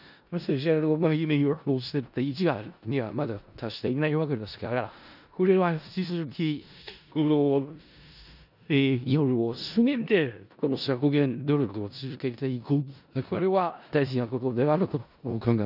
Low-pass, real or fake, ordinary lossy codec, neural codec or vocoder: 5.4 kHz; fake; none; codec, 16 kHz in and 24 kHz out, 0.4 kbps, LongCat-Audio-Codec, four codebook decoder